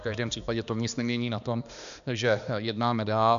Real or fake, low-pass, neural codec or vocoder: fake; 7.2 kHz; codec, 16 kHz, 2 kbps, X-Codec, HuBERT features, trained on balanced general audio